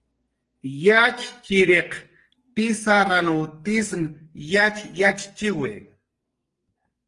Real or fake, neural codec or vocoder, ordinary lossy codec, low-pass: fake; codec, 44.1 kHz, 2.6 kbps, SNAC; Opus, 24 kbps; 10.8 kHz